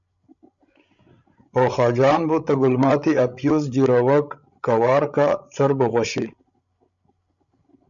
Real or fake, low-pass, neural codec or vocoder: fake; 7.2 kHz; codec, 16 kHz, 16 kbps, FreqCodec, larger model